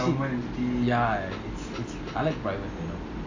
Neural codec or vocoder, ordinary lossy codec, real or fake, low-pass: none; AAC, 48 kbps; real; 7.2 kHz